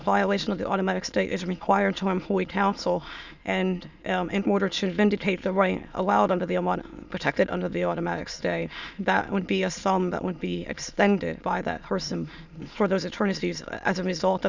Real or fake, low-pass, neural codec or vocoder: fake; 7.2 kHz; autoencoder, 22.05 kHz, a latent of 192 numbers a frame, VITS, trained on many speakers